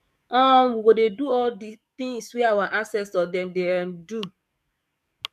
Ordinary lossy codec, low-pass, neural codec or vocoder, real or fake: none; 14.4 kHz; codec, 44.1 kHz, 7.8 kbps, DAC; fake